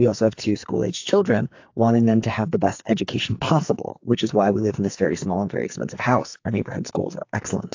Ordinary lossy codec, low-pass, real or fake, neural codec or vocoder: AAC, 48 kbps; 7.2 kHz; fake; codec, 44.1 kHz, 2.6 kbps, SNAC